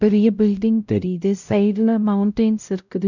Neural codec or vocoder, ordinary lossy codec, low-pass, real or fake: codec, 16 kHz, 0.5 kbps, X-Codec, WavLM features, trained on Multilingual LibriSpeech; none; 7.2 kHz; fake